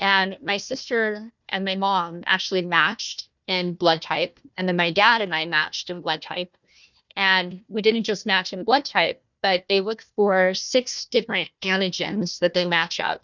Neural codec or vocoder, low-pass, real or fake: codec, 16 kHz, 1 kbps, FunCodec, trained on Chinese and English, 50 frames a second; 7.2 kHz; fake